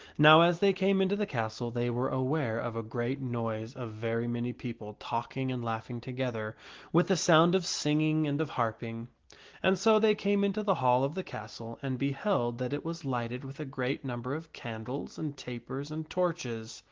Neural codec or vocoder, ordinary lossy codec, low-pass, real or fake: none; Opus, 16 kbps; 7.2 kHz; real